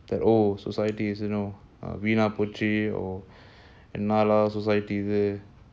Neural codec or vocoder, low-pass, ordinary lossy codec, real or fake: none; none; none; real